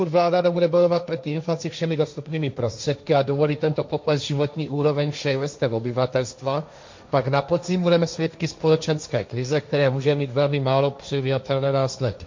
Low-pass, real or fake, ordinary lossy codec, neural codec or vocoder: 7.2 kHz; fake; MP3, 48 kbps; codec, 16 kHz, 1.1 kbps, Voila-Tokenizer